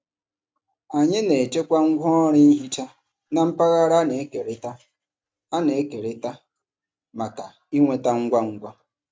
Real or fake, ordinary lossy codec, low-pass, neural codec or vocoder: real; none; none; none